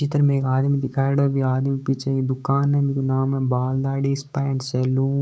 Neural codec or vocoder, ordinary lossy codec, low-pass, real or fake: codec, 16 kHz, 6 kbps, DAC; none; none; fake